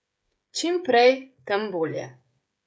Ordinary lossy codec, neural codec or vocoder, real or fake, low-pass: none; codec, 16 kHz, 16 kbps, FreqCodec, smaller model; fake; none